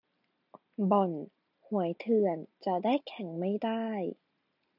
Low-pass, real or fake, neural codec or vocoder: 5.4 kHz; real; none